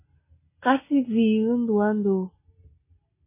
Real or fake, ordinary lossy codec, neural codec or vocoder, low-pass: real; MP3, 16 kbps; none; 3.6 kHz